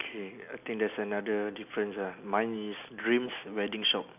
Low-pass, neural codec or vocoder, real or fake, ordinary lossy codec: 3.6 kHz; none; real; none